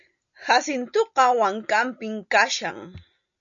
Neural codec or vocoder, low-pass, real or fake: none; 7.2 kHz; real